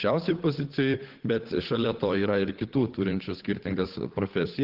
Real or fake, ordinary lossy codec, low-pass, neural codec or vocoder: fake; Opus, 16 kbps; 5.4 kHz; codec, 16 kHz, 8 kbps, FunCodec, trained on Chinese and English, 25 frames a second